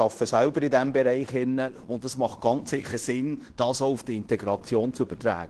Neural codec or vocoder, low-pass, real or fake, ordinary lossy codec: codec, 16 kHz in and 24 kHz out, 0.9 kbps, LongCat-Audio-Codec, fine tuned four codebook decoder; 10.8 kHz; fake; Opus, 16 kbps